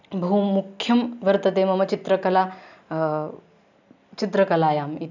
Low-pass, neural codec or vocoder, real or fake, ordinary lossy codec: 7.2 kHz; none; real; none